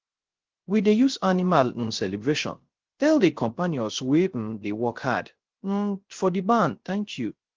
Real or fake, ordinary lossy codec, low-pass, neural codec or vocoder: fake; Opus, 16 kbps; 7.2 kHz; codec, 16 kHz, 0.3 kbps, FocalCodec